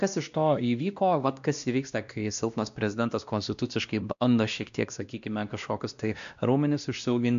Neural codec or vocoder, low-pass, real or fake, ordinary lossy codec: codec, 16 kHz, 1 kbps, X-Codec, WavLM features, trained on Multilingual LibriSpeech; 7.2 kHz; fake; AAC, 96 kbps